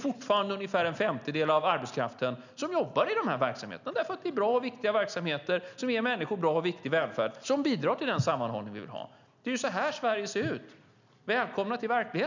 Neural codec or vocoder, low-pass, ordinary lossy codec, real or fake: none; 7.2 kHz; none; real